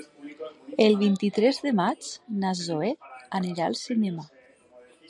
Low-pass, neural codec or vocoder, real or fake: 10.8 kHz; none; real